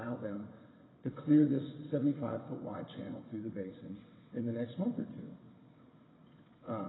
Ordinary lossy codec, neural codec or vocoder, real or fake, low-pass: AAC, 16 kbps; vocoder, 22.05 kHz, 80 mel bands, WaveNeXt; fake; 7.2 kHz